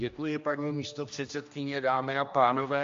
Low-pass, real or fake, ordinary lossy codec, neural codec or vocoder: 7.2 kHz; fake; MP3, 48 kbps; codec, 16 kHz, 1 kbps, X-Codec, HuBERT features, trained on general audio